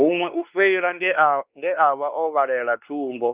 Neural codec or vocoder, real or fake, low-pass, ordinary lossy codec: codec, 16 kHz, 2 kbps, X-Codec, WavLM features, trained on Multilingual LibriSpeech; fake; 3.6 kHz; Opus, 24 kbps